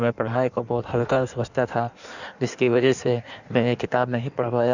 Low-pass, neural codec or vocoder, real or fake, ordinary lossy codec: 7.2 kHz; codec, 16 kHz in and 24 kHz out, 1.1 kbps, FireRedTTS-2 codec; fake; none